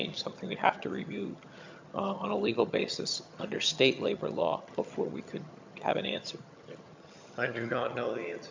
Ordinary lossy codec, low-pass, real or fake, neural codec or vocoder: MP3, 64 kbps; 7.2 kHz; fake; vocoder, 22.05 kHz, 80 mel bands, HiFi-GAN